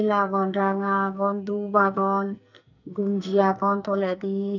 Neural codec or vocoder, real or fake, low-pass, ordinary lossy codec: codec, 44.1 kHz, 2.6 kbps, SNAC; fake; 7.2 kHz; none